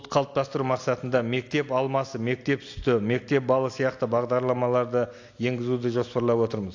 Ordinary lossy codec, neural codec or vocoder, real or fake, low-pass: AAC, 48 kbps; none; real; 7.2 kHz